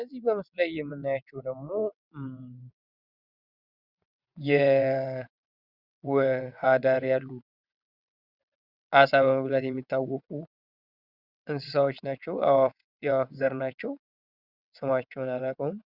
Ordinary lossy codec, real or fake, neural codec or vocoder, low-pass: Opus, 64 kbps; fake; vocoder, 22.05 kHz, 80 mel bands, WaveNeXt; 5.4 kHz